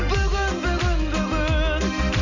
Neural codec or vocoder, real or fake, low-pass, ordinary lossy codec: none; real; 7.2 kHz; none